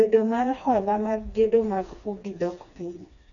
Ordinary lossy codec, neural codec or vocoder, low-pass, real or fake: none; codec, 16 kHz, 2 kbps, FreqCodec, smaller model; 7.2 kHz; fake